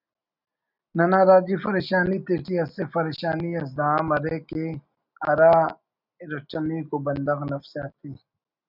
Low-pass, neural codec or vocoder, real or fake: 5.4 kHz; none; real